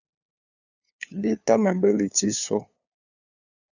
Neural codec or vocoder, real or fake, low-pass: codec, 16 kHz, 2 kbps, FunCodec, trained on LibriTTS, 25 frames a second; fake; 7.2 kHz